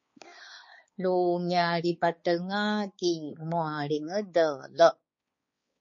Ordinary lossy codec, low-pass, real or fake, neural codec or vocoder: MP3, 32 kbps; 7.2 kHz; fake; codec, 16 kHz, 4 kbps, X-Codec, HuBERT features, trained on balanced general audio